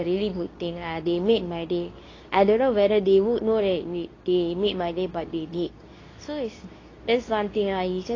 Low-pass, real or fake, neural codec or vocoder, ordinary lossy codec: 7.2 kHz; fake; codec, 24 kHz, 0.9 kbps, WavTokenizer, medium speech release version 2; AAC, 32 kbps